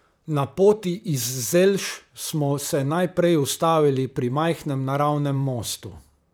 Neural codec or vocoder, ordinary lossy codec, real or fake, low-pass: vocoder, 44.1 kHz, 128 mel bands, Pupu-Vocoder; none; fake; none